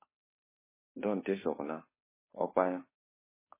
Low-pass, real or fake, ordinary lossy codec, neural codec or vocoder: 3.6 kHz; fake; MP3, 16 kbps; codec, 16 kHz, 2 kbps, FunCodec, trained on Chinese and English, 25 frames a second